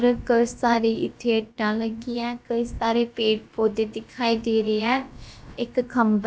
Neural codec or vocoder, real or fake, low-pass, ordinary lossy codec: codec, 16 kHz, about 1 kbps, DyCAST, with the encoder's durations; fake; none; none